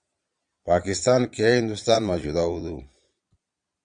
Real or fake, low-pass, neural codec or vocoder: fake; 9.9 kHz; vocoder, 22.05 kHz, 80 mel bands, Vocos